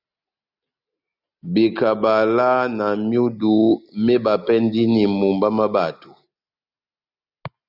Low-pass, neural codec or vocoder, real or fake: 5.4 kHz; none; real